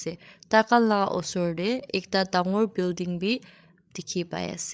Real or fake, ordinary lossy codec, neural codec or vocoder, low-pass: fake; none; codec, 16 kHz, 8 kbps, FreqCodec, larger model; none